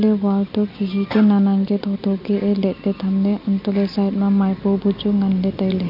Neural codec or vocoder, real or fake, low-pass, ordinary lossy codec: none; real; 5.4 kHz; none